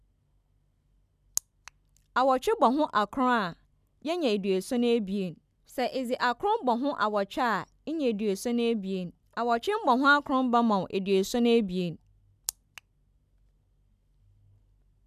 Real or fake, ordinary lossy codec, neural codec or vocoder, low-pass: real; none; none; 14.4 kHz